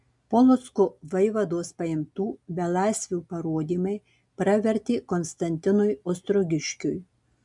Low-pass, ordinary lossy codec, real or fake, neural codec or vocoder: 10.8 kHz; MP3, 96 kbps; real; none